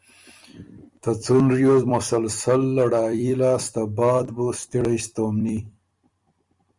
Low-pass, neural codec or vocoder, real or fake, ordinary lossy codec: 10.8 kHz; vocoder, 44.1 kHz, 128 mel bands every 256 samples, BigVGAN v2; fake; Opus, 64 kbps